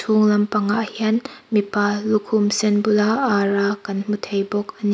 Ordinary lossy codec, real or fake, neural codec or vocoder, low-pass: none; real; none; none